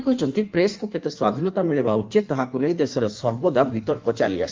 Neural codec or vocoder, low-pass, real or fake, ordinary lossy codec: codec, 16 kHz in and 24 kHz out, 1.1 kbps, FireRedTTS-2 codec; 7.2 kHz; fake; Opus, 32 kbps